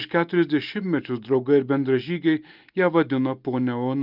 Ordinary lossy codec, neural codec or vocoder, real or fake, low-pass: Opus, 24 kbps; none; real; 5.4 kHz